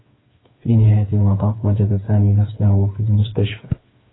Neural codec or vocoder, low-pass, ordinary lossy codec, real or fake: codec, 16 kHz, 4 kbps, FreqCodec, smaller model; 7.2 kHz; AAC, 16 kbps; fake